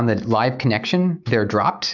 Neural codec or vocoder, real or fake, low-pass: autoencoder, 48 kHz, 128 numbers a frame, DAC-VAE, trained on Japanese speech; fake; 7.2 kHz